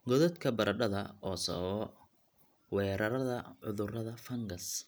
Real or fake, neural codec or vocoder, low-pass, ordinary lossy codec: real; none; none; none